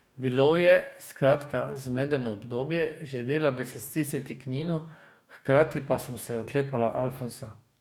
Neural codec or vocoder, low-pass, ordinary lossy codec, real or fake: codec, 44.1 kHz, 2.6 kbps, DAC; 19.8 kHz; none; fake